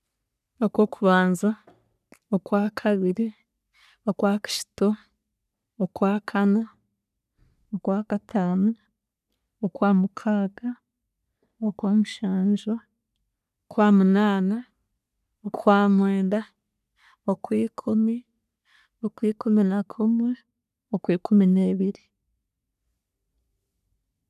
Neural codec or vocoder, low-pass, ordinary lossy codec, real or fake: codec, 44.1 kHz, 3.4 kbps, Pupu-Codec; 14.4 kHz; none; fake